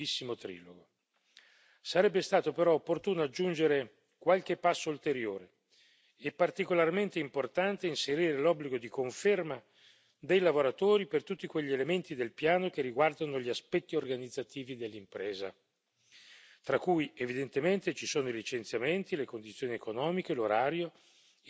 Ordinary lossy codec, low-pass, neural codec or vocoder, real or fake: none; none; none; real